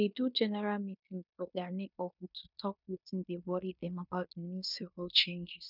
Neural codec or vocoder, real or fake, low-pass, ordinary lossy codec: codec, 16 kHz in and 24 kHz out, 0.9 kbps, LongCat-Audio-Codec, fine tuned four codebook decoder; fake; 5.4 kHz; none